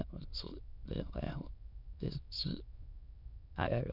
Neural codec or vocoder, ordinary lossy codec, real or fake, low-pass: autoencoder, 22.05 kHz, a latent of 192 numbers a frame, VITS, trained on many speakers; none; fake; 5.4 kHz